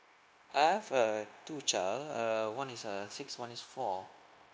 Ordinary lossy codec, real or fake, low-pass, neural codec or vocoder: none; fake; none; codec, 16 kHz, 0.9 kbps, LongCat-Audio-Codec